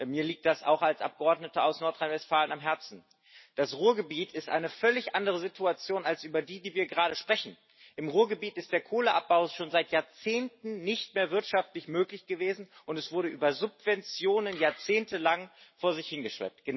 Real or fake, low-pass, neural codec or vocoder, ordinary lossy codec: real; 7.2 kHz; none; MP3, 24 kbps